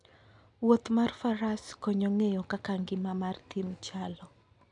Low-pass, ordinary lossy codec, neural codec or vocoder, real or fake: 10.8 kHz; none; none; real